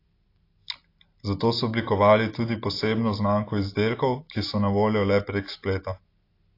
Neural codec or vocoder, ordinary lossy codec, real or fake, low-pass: none; AAC, 32 kbps; real; 5.4 kHz